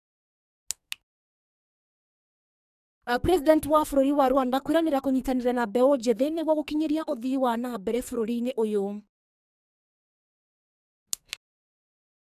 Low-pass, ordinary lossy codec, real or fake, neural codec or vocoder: 14.4 kHz; none; fake; codec, 44.1 kHz, 2.6 kbps, SNAC